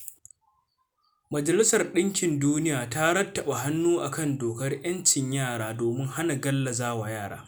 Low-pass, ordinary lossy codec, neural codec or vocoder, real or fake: none; none; none; real